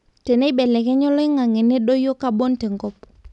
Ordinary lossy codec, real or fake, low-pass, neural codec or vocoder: none; real; 10.8 kHz; none